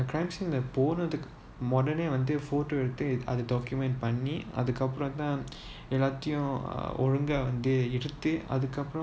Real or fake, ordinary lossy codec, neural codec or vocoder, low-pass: real; none; none; none